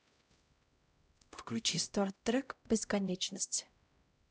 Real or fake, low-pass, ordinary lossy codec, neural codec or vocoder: fake; none; none; codec, 16 kHz, 0.5 kbps, X-Codec, HuBERT features, trained on LibriSpeech